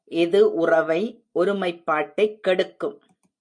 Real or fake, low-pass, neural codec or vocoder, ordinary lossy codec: real; 9.9 kHz; none; MP3, 48 kbps